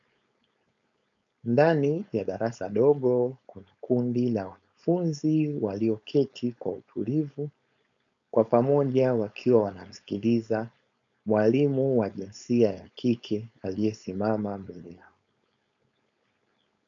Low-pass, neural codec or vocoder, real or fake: 7.2 kHz; codec, 16 kHz, 4.8 kbps, FACodec; fake